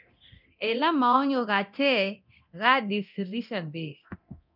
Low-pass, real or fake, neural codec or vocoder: 5.4 kHz; fake; codec, 24 kHz, 0.9 kbps, DualCodec